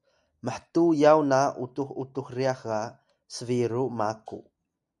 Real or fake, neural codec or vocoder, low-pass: real; none; 10.8 kHz